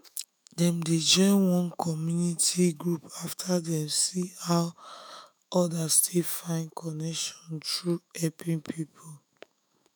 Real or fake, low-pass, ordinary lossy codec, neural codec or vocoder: fake; none; none; autoencoder, 48 kHz, 128 numbers a frame, DAC-VAE, trained on Japanese speech